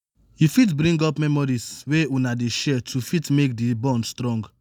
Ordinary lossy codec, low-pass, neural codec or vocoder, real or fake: none; none; none; real